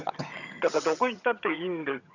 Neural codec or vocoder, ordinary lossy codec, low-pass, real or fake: vocoder, 22.05 kHz, 80 mel bands, HiFi-GAN; none; 7.2 kHz; fake